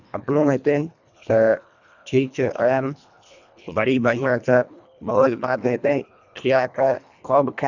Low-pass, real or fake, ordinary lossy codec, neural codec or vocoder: 7.2 kHz; fake; none; codec, 24 kHz, 1.5 kbps, HILCodec